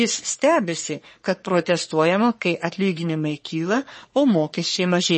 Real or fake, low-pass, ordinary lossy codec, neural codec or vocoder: fake; 10.8 kHz; MP3, 32 kbps; codec, 44.1 kHz, 3.4 kbps, Pupu-Codec